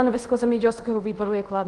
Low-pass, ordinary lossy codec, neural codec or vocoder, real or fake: 10.8 kHz; Opus, 24 kbps; codec, 24 kHz, 0.5 kbps, DualCodec; fake